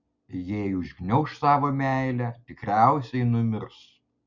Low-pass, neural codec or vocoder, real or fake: 7.2 kHz; none; real